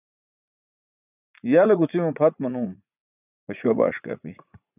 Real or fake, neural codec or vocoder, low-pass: fake; vocoder, 44.1 kHz, 80 mel bands, Vocos; 3.6 kHz